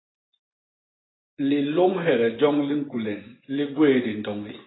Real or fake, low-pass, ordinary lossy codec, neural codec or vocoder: fake; 7.2 kHz; AAC, 16 kbps; vocoder, 24 kHz, 100 mel bands, Vocos